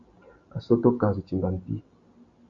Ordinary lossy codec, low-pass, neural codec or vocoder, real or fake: Opus, 64 kbps; 7.2 kHz; none; real